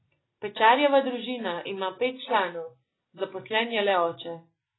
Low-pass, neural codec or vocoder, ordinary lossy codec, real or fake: 7.2 kHz; none; AAC, 16 kbps; real